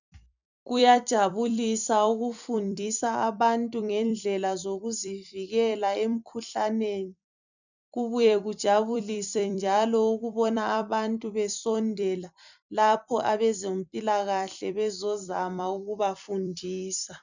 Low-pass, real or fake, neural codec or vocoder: 7.2 kHz; fake; vocoder, 44.1 kHz, 128 mel bands every 256 samples, BigVGAN v2